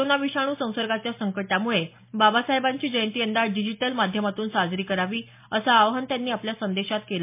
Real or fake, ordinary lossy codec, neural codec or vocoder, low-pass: real; MP3, 32 kbps; none; 3.6 kHz